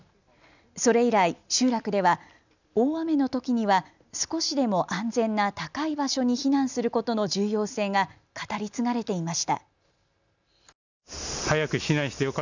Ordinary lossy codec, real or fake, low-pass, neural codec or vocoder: none; real; 7.2 kHz; none